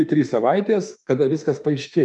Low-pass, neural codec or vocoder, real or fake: 10.8 kHz; autoencoder, 48 kHz, 32 numbers a frame, DAC-VAE, trained on Japanese speech; fake